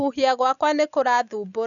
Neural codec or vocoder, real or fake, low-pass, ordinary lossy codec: none; real; 7.2 kHz; none